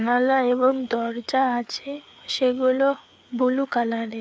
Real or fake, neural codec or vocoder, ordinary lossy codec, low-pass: fake; codec, 16 kHz, 4 kbps, FreqCodec, larger model; none; none